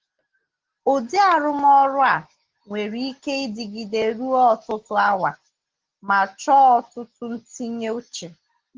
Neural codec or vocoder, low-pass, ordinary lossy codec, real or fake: none; 7.2 kHz; Opus, 16 kbps; real